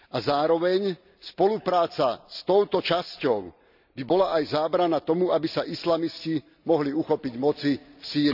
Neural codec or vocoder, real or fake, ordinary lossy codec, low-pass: none; real; none; 5.4 kHz